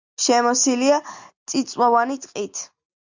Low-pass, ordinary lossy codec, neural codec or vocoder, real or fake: 7.2 kHz; Opus, 64 kbps; none; real